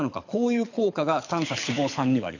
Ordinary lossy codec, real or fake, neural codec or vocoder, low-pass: none; fake; codec, 24 kHz, 6 kbps, HILCodec; 7.2 kHz